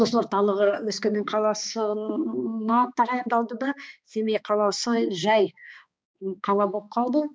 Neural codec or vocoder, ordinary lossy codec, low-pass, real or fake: codec, 16 kHz, 2 kbps, X-Codec, HuBERT features, trained on balanced general audio; none; none; fake